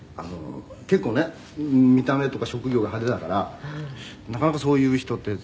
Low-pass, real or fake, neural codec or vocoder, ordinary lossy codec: none; real; none; none